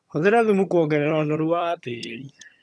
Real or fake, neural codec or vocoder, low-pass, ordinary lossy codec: fake; vocoder, 22.05 kHz, 80 mel bands, HiFi-GAN; none; none